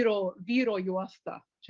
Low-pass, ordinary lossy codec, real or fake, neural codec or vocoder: 7.2 kHz; Opus, 32 kbps; real; none